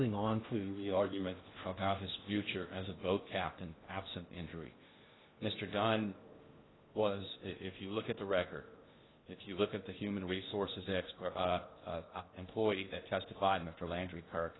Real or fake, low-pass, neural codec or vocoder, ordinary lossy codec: fake; 7.2 kHz; codec, 16 kHz in and 24 kHz out, 0.6 kbps, FocalCodec, streaming, 2048 codes; AAC, 16 kbps